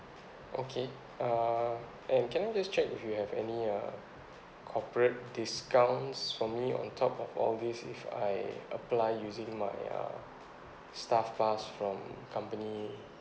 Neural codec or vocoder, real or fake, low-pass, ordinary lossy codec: none; real; none; none